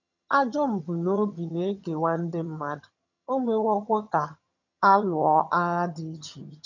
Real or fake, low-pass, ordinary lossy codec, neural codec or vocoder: fake; 7.2 kHz; none; vocoder, 22.05 kHz, 80 mel bands, HiFi-GAN